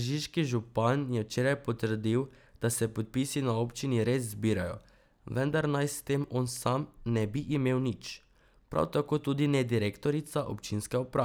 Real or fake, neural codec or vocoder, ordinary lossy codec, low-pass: real; none; none; none